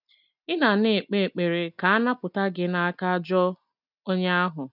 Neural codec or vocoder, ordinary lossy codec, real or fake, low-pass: none; none; real; 5.4 kHz